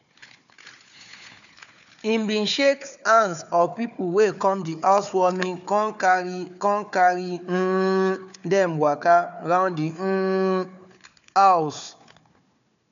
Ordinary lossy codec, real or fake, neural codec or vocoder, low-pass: none; fake; codec, 16 kHz, 4 kbps, FunCodec, trained on Chinese and English, 50 frames a second; 7.2 kHz